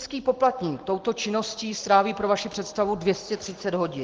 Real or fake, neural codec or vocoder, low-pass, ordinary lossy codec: real; none; 7.2 kHz; Opus, 16 kbps